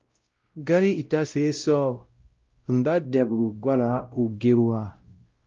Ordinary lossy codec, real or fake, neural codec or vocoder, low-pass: Opus, 32 kbps; fake; codec, 16 kHz, 0.5 kbps, X-Codec, WavLM features, trained on Multilingual LibriSpeech; 7.2 kHz